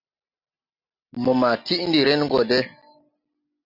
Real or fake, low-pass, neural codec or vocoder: real; 5.4 kHz; none